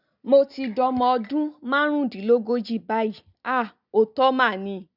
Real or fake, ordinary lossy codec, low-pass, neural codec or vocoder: real; none; 5.4 kHz; none